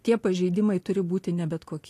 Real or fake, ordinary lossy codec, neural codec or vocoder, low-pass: real; AAC, 48 kbps; none; 14.4 kHz